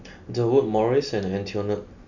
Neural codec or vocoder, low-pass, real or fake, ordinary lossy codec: none; 7.2 kHz; real; none